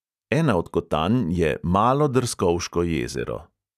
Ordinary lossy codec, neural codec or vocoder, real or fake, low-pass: none; none; real; 14.4 kHz